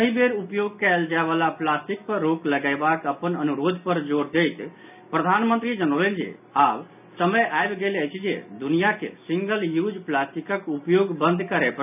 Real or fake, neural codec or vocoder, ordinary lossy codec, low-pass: real; none; none; 3.6 kHz